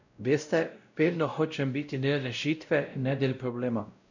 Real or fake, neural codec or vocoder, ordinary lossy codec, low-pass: fake; codec, 16 kHz, 0.5 kbps, X-Codec, WavLM features, trained on Multilingual LibriSpeech; none; 7.2 kHz